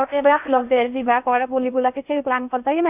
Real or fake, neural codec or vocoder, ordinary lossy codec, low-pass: fake; codec, 16 kHz in and 24 kHz out, 0.8 kbps, FocalCodec, streaming, 65536 codes; none; 3.6 kHz